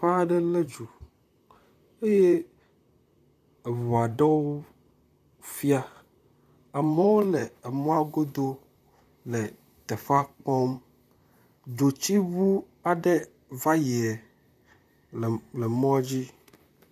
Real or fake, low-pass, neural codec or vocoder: fake; 14.4 kHz; vocoder, 44.1 kHz, 128 mel bands, Pupu-Vocoder